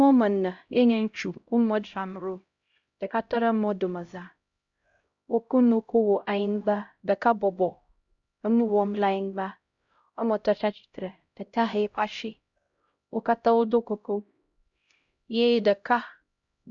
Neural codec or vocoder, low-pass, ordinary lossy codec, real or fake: codec, 16 kHz, 0.5 kbps, X-Codec, HuBERT features, trained on LibriSpeech; 7.2 kHz; Opus, 64 kbps; fake